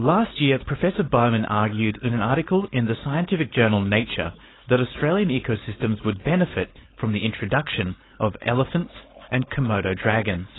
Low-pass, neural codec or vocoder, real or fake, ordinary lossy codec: 7.2 kHz; codec, 16 kHz, 4.8 kbps, FACodec; fake; AAC, 16 kbps